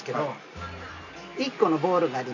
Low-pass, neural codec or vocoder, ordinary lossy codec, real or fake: 7.2 kHz; none; none; real